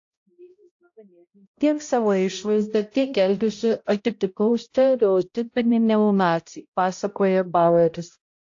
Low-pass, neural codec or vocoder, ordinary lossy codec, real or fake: 7.2 kHz; codec, 16 kHz, 0.5 kbps, X-Codec, HuBERT features, trained on balanced general audio; AAC, 48 kbps; fake